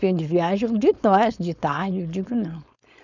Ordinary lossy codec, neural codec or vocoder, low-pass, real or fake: none; codec, 16 kHz, 4.8 kbps, FACodec; 7.2 kHz; fake